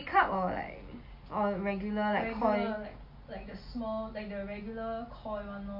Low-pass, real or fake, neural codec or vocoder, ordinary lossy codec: 5.4 kHz; real; none; MP3, 32 kbps